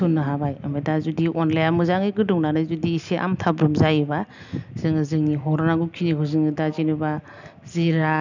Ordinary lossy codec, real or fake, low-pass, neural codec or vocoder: none; real; 7.2 kHz; none